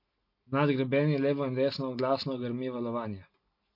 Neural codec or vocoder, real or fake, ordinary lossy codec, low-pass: none; real; MP3, 32 kbps; 5.4 kHz